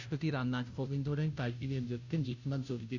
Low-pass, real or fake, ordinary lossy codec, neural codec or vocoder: 7.2 kHz; fake; none; codec, 16 kHz, 0.5 kbps, FunCodec, trained on Chinese and English, 25 frames a second